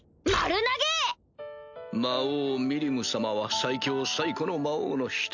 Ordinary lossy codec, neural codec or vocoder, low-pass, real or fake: none; none; 7.2 kHz; real